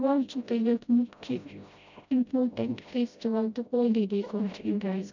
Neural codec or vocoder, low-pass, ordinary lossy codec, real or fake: codec, 16 kHz, 0.5 kbps, FreqCodec, smaller model; 7.2 kHz; none; fake